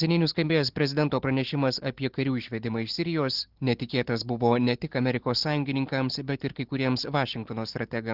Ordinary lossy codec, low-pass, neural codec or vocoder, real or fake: Opus, 16 kbps; 5.4 kHz; none; real